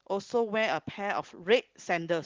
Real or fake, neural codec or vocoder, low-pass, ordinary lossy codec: real; none; 7.2 kHz; Opus, 16 kbps